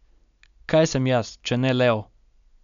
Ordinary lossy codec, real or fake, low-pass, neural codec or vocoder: none; real; 7.2 kHz; none